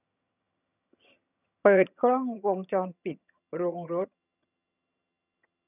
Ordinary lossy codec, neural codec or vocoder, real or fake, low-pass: none; vocoder, 22.05 kHz, 80 mel bands, HiFi-GAN; fake; 3.6 kHz